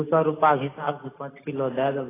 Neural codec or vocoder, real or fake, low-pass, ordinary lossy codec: none; real; 3.6 kHz; AAC, 16 kbps